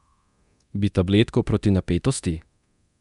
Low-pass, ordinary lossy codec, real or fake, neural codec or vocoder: 10.8 kHz; none; fake; codec, 24 kHz, 0.9 kbps, DualCodec